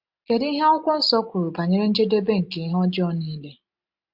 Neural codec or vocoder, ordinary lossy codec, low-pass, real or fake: none; MP3, 48 kbps; 5.4 kHz; real